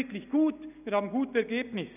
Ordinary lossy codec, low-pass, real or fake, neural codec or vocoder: none; 3.6 kHz; real; none